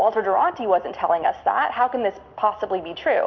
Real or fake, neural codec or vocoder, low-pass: real; none; 7.2 kHz